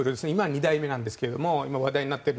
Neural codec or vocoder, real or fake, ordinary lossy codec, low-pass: none; real; none; none